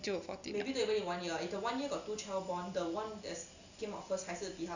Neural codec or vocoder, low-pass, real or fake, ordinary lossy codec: none; 7.2 kHz; real; none